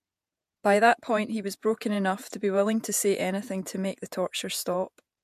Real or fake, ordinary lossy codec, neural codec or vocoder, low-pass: fake; MP3, 64 kbps; vocoder, 48 kHz, 128 mel bands, Vocos; 14.4 kHz